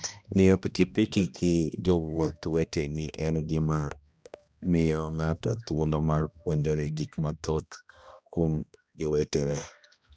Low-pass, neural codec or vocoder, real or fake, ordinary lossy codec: none; codec, 16 kHz, 1 kbps, X-Codec, HuBERT features, trained on balanced general audio; fake; none